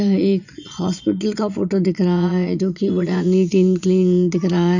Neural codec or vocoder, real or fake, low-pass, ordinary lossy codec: vocoder, 22.05 kHz, 80 mel bands, Vocos; fake; 7.2 kHz; none